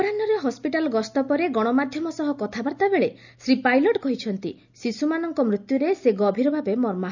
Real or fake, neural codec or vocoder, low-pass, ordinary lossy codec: real; none; 7.2 kHz; none